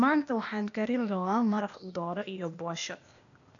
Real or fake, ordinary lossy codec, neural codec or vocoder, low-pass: fake; none; codec, 16 kHz, 0.8 kbps, ZipCodec; 7.2 kHz